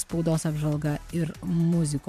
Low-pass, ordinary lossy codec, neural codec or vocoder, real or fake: 14.4 kHz; MP3, 96 kbps; vocoder, 44.1 kHz, 128 mel bands every 512 samples, BigVGAN v2; fake